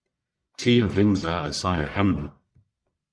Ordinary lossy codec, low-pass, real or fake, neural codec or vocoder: Opus, 64 kbps; 9.9 kHz; fake; codec, 44.1 kHz, 1.7 kbps, Pupu-Codec